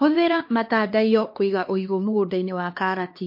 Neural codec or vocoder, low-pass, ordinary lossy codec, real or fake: codec, 16 kHz, 2 kbps, X-Codec, HuBERT features, trained on LibriSpeech; 5.4 kHz; MP3, 32 kbps; fake